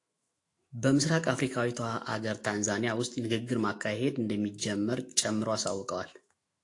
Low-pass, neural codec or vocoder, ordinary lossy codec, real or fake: 10.8 kHz; autoencoder, 48 kHz, 128 numbers a frame, DAC-VAE, trained on Japanese speech; AAC, 48 kbps; fake